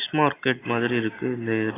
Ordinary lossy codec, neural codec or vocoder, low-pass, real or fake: AAC, 16 kbps; none; 3.6 kHz; real